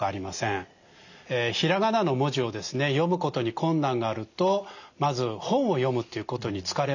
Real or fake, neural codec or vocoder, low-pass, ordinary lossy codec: real; none; 7.2 kHz; none